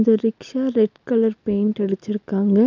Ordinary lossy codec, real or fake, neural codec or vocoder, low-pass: none; real; none; 7.2 kHz